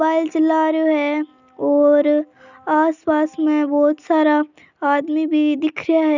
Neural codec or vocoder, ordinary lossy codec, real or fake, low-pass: none; none; real; 7.2 kHz